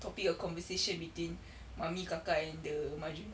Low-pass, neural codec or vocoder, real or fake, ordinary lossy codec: none; none; real; none